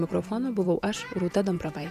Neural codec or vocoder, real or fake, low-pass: vocoder, 48 kHz, 128 mel bands, Vocos; fake; 14.4 kHz